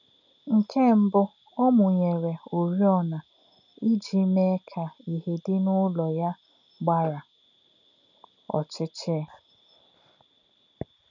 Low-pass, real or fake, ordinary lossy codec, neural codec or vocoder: 7.2 kHz; real; none; none